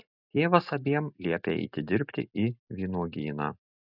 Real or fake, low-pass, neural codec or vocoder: real; 5.4 kHz; none